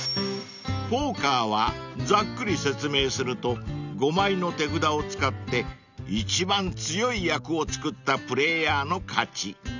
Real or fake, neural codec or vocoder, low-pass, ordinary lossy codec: real; none; 7.2 kHz; none